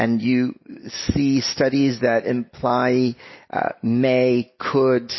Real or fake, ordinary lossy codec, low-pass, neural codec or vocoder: real; MP3, 24 kbps; 7.2 kHz; none